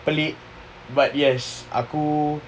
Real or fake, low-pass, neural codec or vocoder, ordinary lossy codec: real; none; none; none